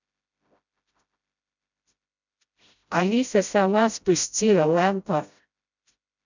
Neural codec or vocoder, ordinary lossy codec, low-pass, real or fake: codec, 16 kHz, 0.5 kbps, FreqCodec, smaller model; none; 7.2 kHz; fake